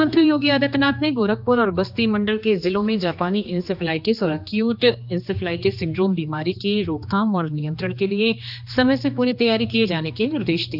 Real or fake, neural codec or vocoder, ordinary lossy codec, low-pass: fake; codec, 16 kHz, 2 kbps, X-Codec, HuBERT features, trained on general audio; none; 5.4 kHz